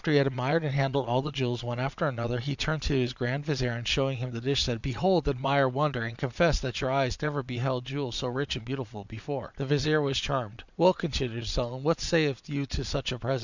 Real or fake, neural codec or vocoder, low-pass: fake; vocoder, 22.05 kHz, 80 mel bands, Vocos; 7.2 kHz